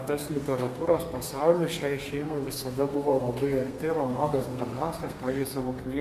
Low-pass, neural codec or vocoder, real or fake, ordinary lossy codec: 14.4 kHz; codec, 32 kHz, 1.9 kbps, SNAC; fake; MP3, 96 kbps